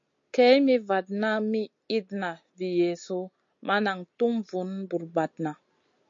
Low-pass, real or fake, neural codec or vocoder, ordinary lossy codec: 7.2 kHz; real; none; MP3, 48 kbps